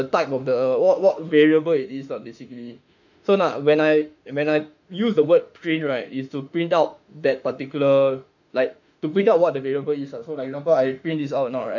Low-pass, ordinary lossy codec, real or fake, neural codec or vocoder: 7.2 kHz; none; fake; autoencoder, 48 kHz, 32 numbers a frame, DAC-VAE, trained on Japanese speech